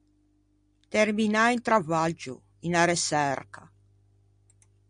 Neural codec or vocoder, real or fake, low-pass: none; real; 9.9 kHz